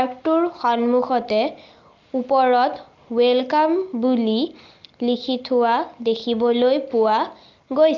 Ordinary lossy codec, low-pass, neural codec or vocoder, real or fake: Opus, 24 kbps; 7.2 kHz; none; real